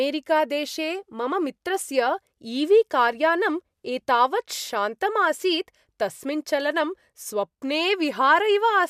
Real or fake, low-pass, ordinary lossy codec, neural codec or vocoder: real; 14.4 kHz; MP3, 96 kbps; none